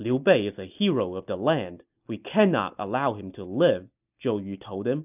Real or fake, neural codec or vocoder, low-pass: real; none; 3.6 kHz